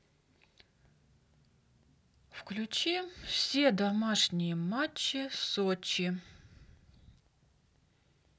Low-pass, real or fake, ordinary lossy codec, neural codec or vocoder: none; real; none; none